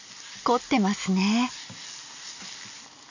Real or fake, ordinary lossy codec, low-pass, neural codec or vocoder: real; none; 7.2 kHz; none